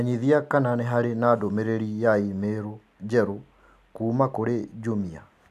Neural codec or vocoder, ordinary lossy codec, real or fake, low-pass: none; AAC, 96 kbps; real; 14.4 kHz